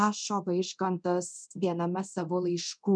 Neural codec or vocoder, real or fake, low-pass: codec, 24 kHz, 0.9 kbps, DualCodec; fake; 9.9 kHz